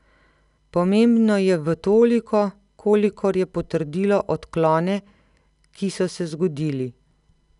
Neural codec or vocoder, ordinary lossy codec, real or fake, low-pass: none; none; real; 10.8 kHz